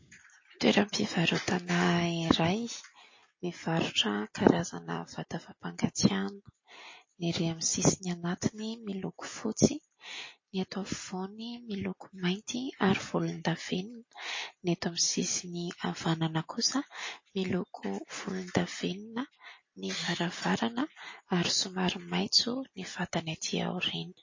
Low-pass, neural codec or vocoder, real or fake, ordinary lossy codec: 7.2 kHz; none; real; MP3, 32 kbps